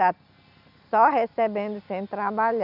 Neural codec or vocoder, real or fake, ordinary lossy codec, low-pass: none; real; none; 5.4 kHz